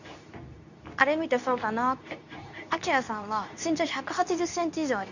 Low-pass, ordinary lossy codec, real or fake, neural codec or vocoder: 7.2 kHz; none; fake; codec, 24 kHz, 0.9 kbps, WavTokenizer, medium speech release version 2